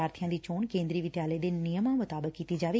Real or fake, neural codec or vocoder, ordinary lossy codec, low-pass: real; none; none; none